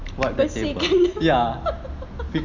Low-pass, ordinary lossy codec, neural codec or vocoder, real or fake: 7.2 kHz; none; none; real